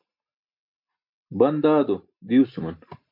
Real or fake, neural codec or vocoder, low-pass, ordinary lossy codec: real; none; 5.4 kHz; MP3, 48 kbps